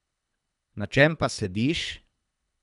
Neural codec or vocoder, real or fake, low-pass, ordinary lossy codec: codec, 24 kHz, 3 kbps, HILCodec; fake; 10.8 kHz; none